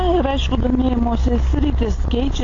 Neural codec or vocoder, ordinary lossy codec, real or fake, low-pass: none; AAC, 32 kbps; real; 7.2 kHz